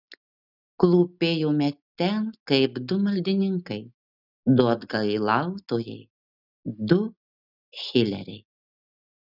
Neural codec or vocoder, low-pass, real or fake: none; 5.4 kHz; real